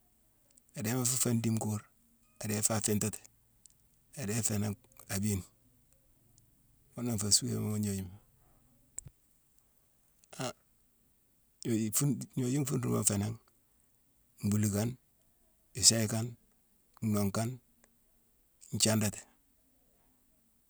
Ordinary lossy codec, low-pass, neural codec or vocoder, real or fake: none; none; none; real